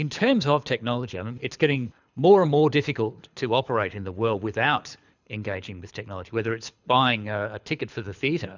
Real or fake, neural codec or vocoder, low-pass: fake; codec, 24 kHz, 6 kbps, HILCodec; 7.2 kHz